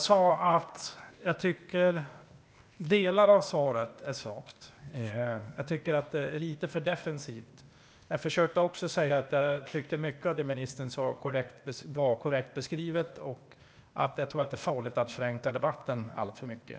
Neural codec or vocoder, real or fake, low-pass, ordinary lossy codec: codec, 16 kHz, 0.8 kbps, ZipCodec; fake; none; none